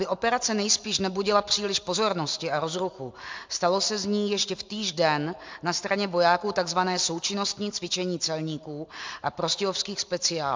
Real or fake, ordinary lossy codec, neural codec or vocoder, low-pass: real; MP3, 64 kbps; none; 7.2 kHz